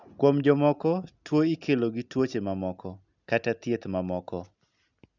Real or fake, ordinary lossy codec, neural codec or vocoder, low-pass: real; none; none; 7.2 kHz